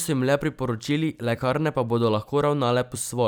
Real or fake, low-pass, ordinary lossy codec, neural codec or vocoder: fake; none; none; vocoder, 44.1 kHz, 128 mel bands every 256 samples, BigVGAN v2